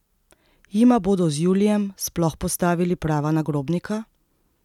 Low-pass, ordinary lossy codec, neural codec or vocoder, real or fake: 19.8 kHz; none; none; real